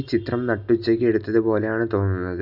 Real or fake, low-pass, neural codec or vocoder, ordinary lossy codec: real; 5.4 kHz; none; none